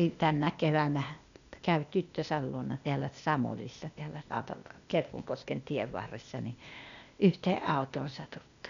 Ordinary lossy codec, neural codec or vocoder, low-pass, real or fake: none; codec, 16 kHz, 0.8 kbps, ZipCodec; 7.2 kHz; fake